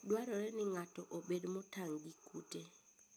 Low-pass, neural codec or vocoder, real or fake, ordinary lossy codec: none; none; real; none